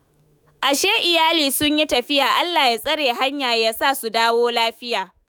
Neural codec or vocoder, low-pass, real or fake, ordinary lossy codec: autoencoder, 48 kHz, 128 numbers a frame, DAC-VAE, trained on Japanese speech; none; fake; none